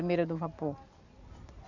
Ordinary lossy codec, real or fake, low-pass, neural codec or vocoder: Opus, 64 kbps; real; 7.2 kHz; none